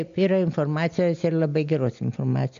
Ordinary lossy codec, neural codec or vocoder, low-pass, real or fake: MP3, 64 kbps; none; 7.2 kHz; real